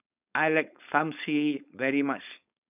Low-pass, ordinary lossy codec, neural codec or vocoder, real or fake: 3.6 kHz; none; codec, 16 kHz, 4.8 kbps, FACodec; fake